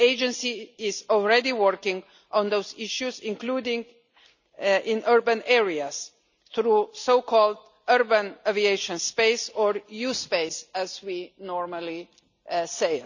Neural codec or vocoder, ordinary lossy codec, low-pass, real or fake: none; none; 7.2 kHz; real